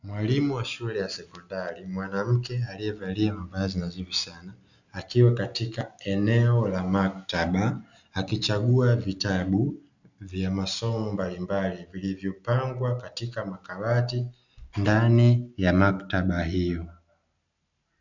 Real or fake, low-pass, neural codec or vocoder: real; 7.2 kHz; none